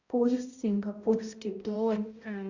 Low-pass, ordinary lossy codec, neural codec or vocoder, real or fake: 7.2 kHz; Opus, 64 kbps; codec, 16 kHz, 0.5 kbps, X-Codec, HuBERT features, trained on balanced general audio; fake